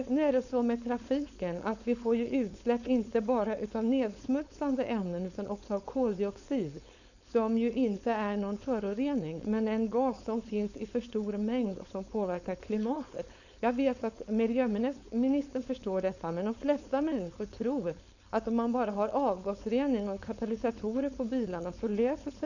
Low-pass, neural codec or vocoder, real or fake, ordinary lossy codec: 7.2 kHz; codec, 16 kHz, 4.8 kbps, FACodec; fake; none